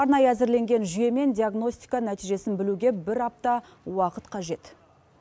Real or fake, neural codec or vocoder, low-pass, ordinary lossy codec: real; none; none; none